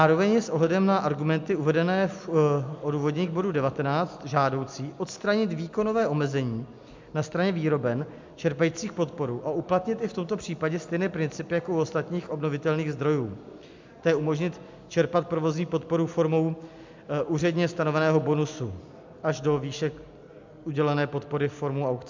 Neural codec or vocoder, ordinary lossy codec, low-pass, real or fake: none; MP3, 64 kbps; 7.2 kHz; real